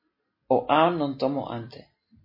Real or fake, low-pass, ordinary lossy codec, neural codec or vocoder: real; 5.4 kHz; MP3, 24 kbps; none